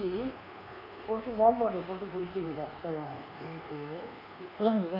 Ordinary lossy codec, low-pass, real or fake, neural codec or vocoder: none; 5.4 kHz; fake; codec, 24 kHz, 1.2 kbps, DualCodec